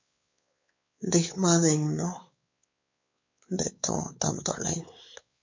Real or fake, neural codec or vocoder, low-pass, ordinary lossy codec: fake; codec, 16 kHz, 4 kbps, X-Codec, WavLM features, trained on Multilingual LibriSpeech; 7.2 kHz; MP3, 48 kbps